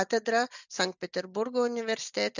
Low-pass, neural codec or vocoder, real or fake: 7.2 kHz; none; real